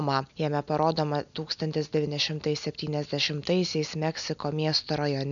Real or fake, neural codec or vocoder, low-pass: real; none; 7.2 kHz